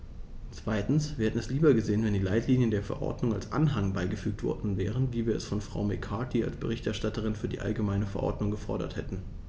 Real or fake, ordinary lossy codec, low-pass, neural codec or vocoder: real; none; none; none